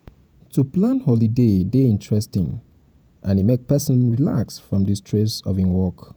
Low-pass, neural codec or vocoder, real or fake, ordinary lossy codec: none; vocoder, 48 kHz, 128 mel bands, Vocos; fake; none